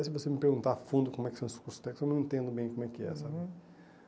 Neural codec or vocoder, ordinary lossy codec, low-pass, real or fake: none; none; none; real